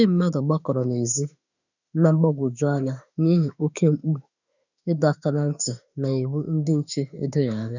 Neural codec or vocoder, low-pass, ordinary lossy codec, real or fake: autoencoder, 48 kHz, 32 numbers a frame, DAC-VAE, trained on Japanese speech; 7.2 kHz; none; fake